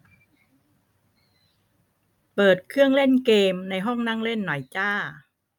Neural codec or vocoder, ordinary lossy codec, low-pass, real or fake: none; none; 19.8 kHz; real